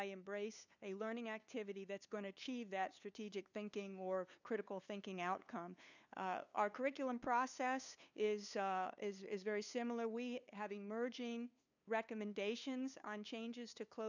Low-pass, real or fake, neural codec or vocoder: 7.2 kHz; fake; codec, 16 kHz, 2 kbps, FunCodec, trained on LibriTTS, 25 frames a second